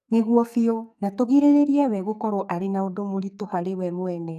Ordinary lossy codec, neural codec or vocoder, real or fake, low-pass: none; codec, 44.1 kHz, 2.6 kbps, SNAC; fake; 14.4 kHz